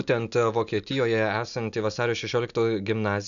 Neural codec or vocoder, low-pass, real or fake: none; 7.2 kHz; real